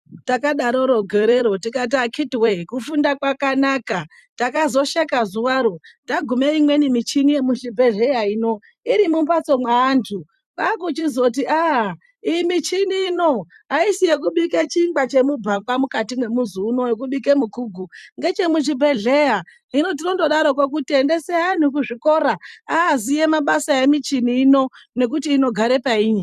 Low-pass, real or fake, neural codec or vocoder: 14.4 kHz; fake; vocoder, 44.1 kHz, 128 mel bands every 256 samples, BigVGAN v2